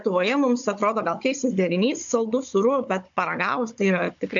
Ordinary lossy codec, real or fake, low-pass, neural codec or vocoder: AAC, 64 kbps; fake; 7.2 kHz; codec, 16 kHz, 16 kbps, FunCodec, trained on Chinese and English, 50 frames a second